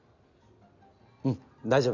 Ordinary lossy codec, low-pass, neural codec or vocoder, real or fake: none; 7.2 kHz; none; real